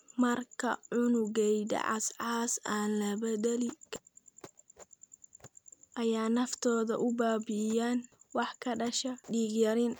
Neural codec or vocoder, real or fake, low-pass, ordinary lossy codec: none; real; none; none